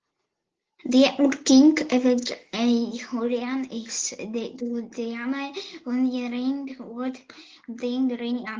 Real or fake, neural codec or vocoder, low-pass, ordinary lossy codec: real; none; 7.2 kHz; Opus, 16 kbps